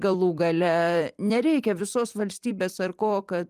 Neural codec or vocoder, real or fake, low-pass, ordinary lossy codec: vocoder, 44.1 kHz, 128 mel bands every 256 samples, BigVGAN v2; fake; 14.4 kHz; Opus, 32 kbps